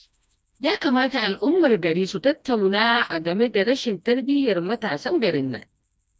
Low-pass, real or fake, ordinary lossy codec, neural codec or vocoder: none; fake; none; codec, 16 kHz, 1 kbps, FreqCodec, smaller model